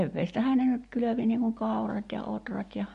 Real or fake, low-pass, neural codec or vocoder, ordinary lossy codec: real; 14.4 kHz; none; MP3, 48 kbps